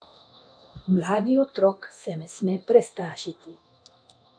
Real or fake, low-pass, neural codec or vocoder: fake; 9.9 kHz; codec, 24 kHz, 0.9 kbps, DualCodec